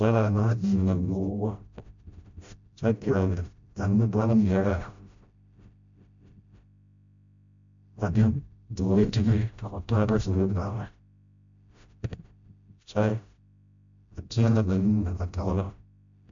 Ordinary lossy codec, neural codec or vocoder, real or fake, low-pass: MP3, 64 kbps; codec, 16 kHz, 0.5 kbps, FreqCodec, smaller model; fake; 7.2 kHz